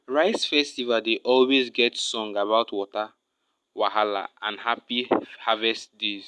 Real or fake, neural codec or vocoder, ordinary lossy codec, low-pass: real; none; none; none